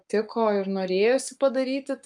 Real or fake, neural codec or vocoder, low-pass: fake; codec, 44.1 kHz, 7.8 kbps, Pupu-Codec; 10.8 kHz